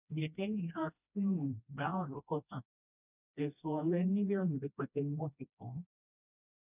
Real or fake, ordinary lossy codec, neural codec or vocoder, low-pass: fake; none; codec, 16 kHz, 1 kbps, FreqCodec, smaller model; 3.6 kHz